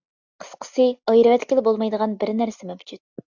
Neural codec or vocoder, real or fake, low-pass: none; real; 7.2 kHz